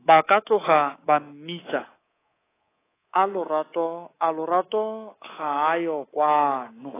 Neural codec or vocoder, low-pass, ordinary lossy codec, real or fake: codec, 24 kHz, 3.1 kbps, DualCodec; 3.6 kHz; AAC, 16 kbps; fake